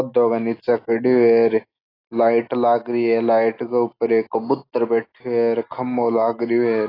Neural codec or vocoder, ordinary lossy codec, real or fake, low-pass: none; AAC, 24 kbps; real; 5.4 kHz